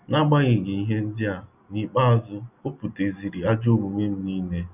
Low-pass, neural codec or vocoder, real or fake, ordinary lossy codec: 3.6 kHz; none; real; none